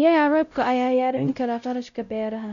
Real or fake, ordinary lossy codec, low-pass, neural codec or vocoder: fake; none; 7.2 kHz; codec, 16 kHz, 0.5 kbps, X-Codec, WavLM features, trained on Multilingual LibriSpeech